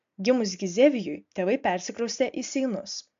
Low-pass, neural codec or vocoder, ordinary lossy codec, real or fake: 7.2 kHz; none; MP3, 64 kbps; real